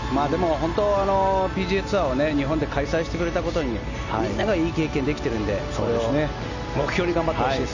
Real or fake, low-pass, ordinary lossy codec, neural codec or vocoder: real; 7.2 kHz; none; none